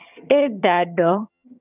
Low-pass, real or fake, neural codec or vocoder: 3.6 kHz; fake; vocoder, 22.05 kHz, 80 mel bands, HiFi-GAN